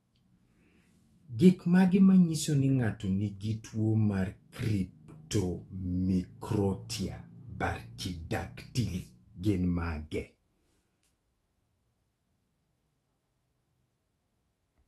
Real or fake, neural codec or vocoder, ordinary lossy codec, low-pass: fake; autoencoder, 48 kHz, 128 numbers a frame, DAC-VAE, trained on Japanese speech; AAC, 32 kbps; 19.8 kHz